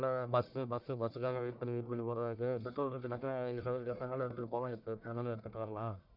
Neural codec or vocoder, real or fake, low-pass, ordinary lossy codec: codec, 44.1 kHz, 1.7 kbps, Pupu-Codec; fake; 5.4 kHz; none